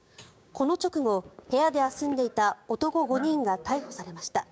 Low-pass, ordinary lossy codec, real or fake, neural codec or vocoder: none; none; fake; codec, 16 kHz, 6 kbps, DAC